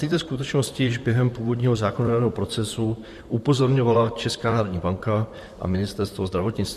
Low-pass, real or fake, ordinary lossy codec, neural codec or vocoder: 14.4 kHz; fake; MP3, 64 kbps; vocoder, 44.1 kHz, 128 mel bands, Pupu-Vocoder